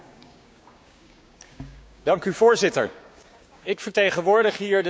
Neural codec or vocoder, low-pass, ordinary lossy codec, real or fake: codec, 16 kHz, 6 kbps, DAC; none; none; fake